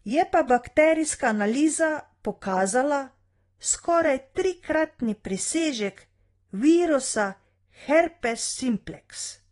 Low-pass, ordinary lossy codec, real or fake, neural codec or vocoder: 10.8 kHz; AAC, 32 kbps; fake; vocoder, 24 kHz, 100 mel bands, Vocos